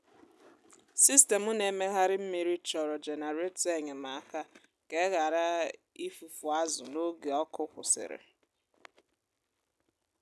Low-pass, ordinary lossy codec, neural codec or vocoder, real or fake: none; none; none; real